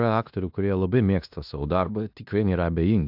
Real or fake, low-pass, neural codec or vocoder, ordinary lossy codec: fake; 5.4 kHz; codec, 16 kHz in and 24 kHz out, 0.9 kbps, LongCat-Audio-Codec, fine tuned four codebook decoder; AAC, 48 kbps